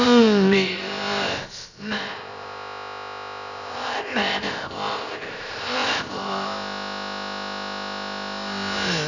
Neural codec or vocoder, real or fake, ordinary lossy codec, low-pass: codec, 16 kHz, about 1 kbps, DyCAST, with the encoder's durations; fake; none; 7.2 kHz